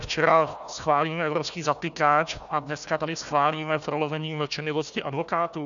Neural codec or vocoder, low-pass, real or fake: codec, 16 kHz, 1 kbps, FunCodec, trained on Chinese and English, 50 frames a second; 7.2 kHz; fake